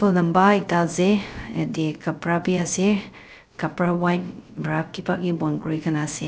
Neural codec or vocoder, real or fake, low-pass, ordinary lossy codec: codec, 16 kHz, 0.3 kbps, FocalCodec; fake; none; none